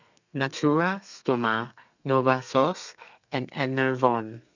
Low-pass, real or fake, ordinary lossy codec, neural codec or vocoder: 7.2 kHz; fake; none; codec, 32 kHz, 1.9 kbps, SNAC